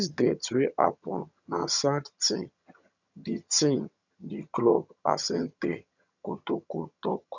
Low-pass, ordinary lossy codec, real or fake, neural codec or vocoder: 7.2 kHz; none; fake; vocoder, 22.05 kHz, 80 mel bands, HiFi-GAN